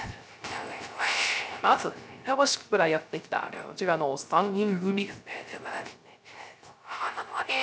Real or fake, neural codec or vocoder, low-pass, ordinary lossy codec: fake; codec, 16 kHz, 0.3 kbps, FocalCodec; none; none